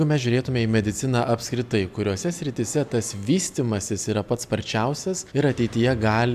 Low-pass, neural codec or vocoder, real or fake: 14.4 kHz; none; real